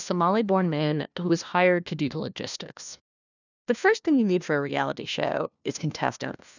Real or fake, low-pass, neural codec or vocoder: fake; 7.2 kHz; codec, 16 kHz, 1 kbps, FunCodec, trained on LibriTTS, 50 frames a second